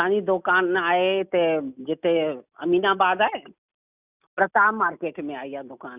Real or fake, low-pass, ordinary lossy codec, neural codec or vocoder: real; 3.6 kHz; none; none